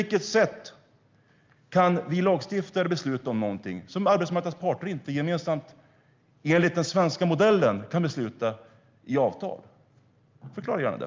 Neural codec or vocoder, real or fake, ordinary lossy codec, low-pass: none; real; Opus, 24 kbps; 7.2 kHz